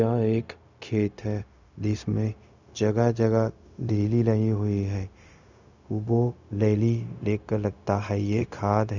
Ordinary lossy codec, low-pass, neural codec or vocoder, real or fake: none; 7.2 kHz; codec, 16 kHz, 0.4 kbps, LongCat-Audio-Codec; fake